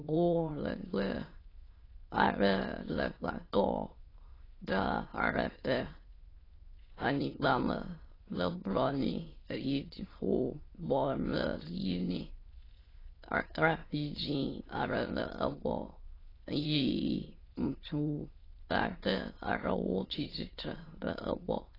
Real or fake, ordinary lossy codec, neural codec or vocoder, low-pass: fake; AAC, 24 kbps; autoencoder, 22.05 kHz, a latent of 192 numbers a frame, VITS, trained on many speakers; 5.4 kHz